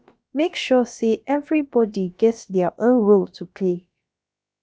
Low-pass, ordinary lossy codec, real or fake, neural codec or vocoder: none; none; fake; codec, 16 kHz, about 1 kbps, DyCAST, with the encoder's durations